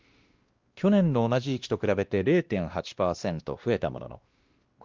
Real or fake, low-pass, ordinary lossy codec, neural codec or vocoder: fake; 7.2 kHz; Opus, 32 kbps; codec, 16 kHz, 1 kbps, X-Codec, WavLM features, trained on Multilingual LibriSpeech